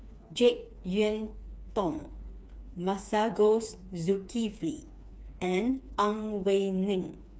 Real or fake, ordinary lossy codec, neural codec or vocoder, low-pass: fake; none; codec, 16 kHz, 4 kbps, FreqCodec, smaller model; none